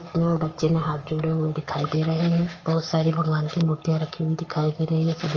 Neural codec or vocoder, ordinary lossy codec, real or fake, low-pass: codec, 44.1 kHz, 7.8 kbps, Pupu-Codec; Opus, 24 kbps; fake; 7.2 kHz